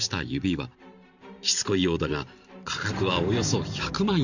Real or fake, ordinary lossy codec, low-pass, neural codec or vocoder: fake; none; 7.2 kHz; vocoder, 44.1 kHz, 128 mel bands every 512 samples, BigVGAN v2